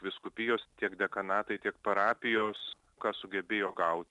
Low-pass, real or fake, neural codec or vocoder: 10.8 kHz; real; none